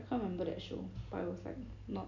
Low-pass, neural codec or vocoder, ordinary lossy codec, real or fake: 7.2 kHz; none; none; real